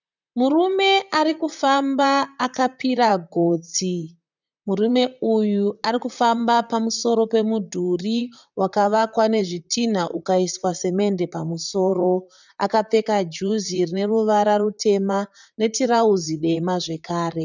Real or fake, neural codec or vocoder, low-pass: fake; vocoder, 44.1 kHz, 128 mel bands, Pupu-Vocoder; 7.2 kHz